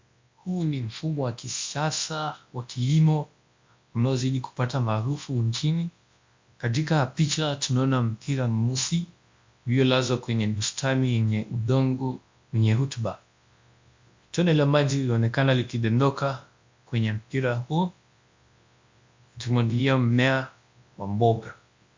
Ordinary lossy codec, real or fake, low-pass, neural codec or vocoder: MP3, 48 kbps; fake; 7.2 kHz; codec, 24 kHz, 0.9 kbps, WavTokenizer, large speech release